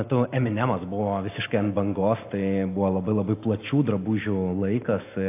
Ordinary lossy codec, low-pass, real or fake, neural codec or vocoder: AAC, 24 kbps; 3.6 kHz; real; none